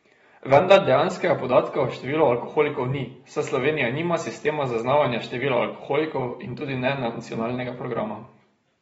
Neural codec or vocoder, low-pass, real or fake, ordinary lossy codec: none; 19.8 kHz; real; AAC, 24 kbps